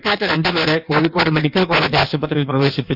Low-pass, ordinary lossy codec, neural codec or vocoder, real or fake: 5.4 kHz; none; codec, 16 kHz in and 24 kHz out, 1.1 kbps, FireRedTTS-2 codec; fake